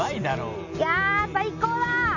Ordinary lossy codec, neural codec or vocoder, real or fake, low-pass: none; none; real; 7.2 kHz